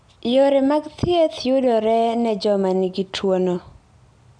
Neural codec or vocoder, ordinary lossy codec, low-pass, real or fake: none; MP3, 96 kbps; 9.9 kHz; real